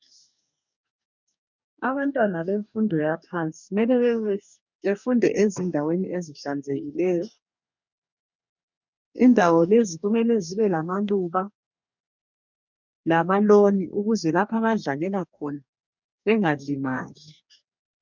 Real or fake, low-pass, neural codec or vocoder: fake; 7.2 kHz; codec, 44.1 kHz, 2.6 kbps, DAC